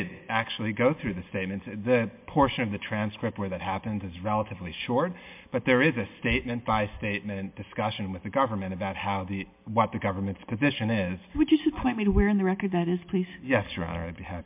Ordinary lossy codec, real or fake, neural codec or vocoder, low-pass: MP3, 32 kbps; real; none; 3.6 kHz